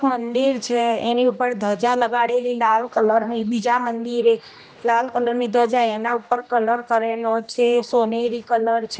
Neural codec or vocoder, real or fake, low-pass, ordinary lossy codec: codec, 16 kHz, 1 kbps, X-Codec, HuBERT features, trained on general audio; fake; none; none